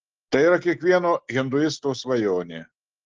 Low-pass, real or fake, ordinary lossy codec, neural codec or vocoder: 7.2 kHz; real; Opus, 16 kbps; none